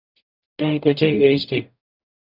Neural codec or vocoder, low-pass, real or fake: codec, 44.1 kHz, 0.9 kbps, DAC; 5.4 kHz; fake